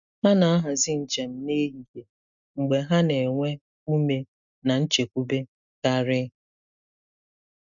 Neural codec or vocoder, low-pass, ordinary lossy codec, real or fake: none; 7.2 kHz; none; real